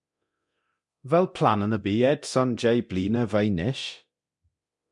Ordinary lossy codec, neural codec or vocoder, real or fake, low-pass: MP3, 64 kbps; codec, 24 kHz, 0.9 kbps, DualCodec; fake; 10.8 kHz